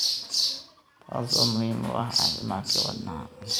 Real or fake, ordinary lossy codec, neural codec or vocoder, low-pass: fake; none; vocoder, 44.1 kHz, 128 mel bands every 256 samples, BigVGAN v2; none